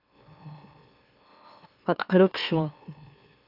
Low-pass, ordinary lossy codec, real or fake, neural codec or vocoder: 5.4 kHz; AAC, 32 kbps; fake; autoencoder, 44.1 kHz, a latent of 192 numbers a frame, MeloTTS